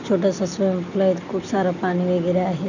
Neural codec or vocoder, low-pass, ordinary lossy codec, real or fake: none; 7.2 kHz; none; real